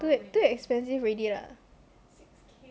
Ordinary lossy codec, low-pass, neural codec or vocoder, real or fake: none; none; none; real